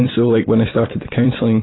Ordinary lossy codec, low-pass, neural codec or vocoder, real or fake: AAC, 16 kbps; 7.2 kHz; vocoder, 44.1 kHz, 128 mel bands every 256 samples, BigVGAN v2; fake